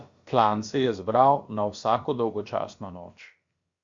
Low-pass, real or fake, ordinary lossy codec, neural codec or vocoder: 7.2 kHz; fake; Opus, 64 kbps; codec, 16 kHz, about 1 kbps, DyCAST, with the encoder's durations